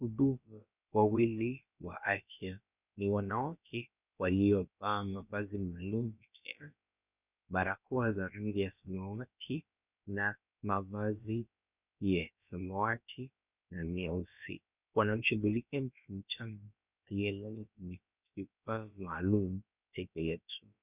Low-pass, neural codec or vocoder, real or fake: 3.6 kHz; codec, 16 kHz, about 1 kbps, DyCAST, with the encoder's durations; fake